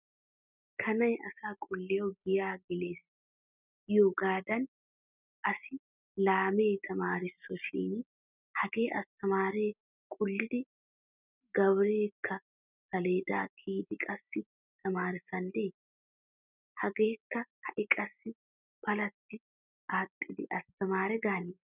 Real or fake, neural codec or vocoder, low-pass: real; none; 3.6 kHz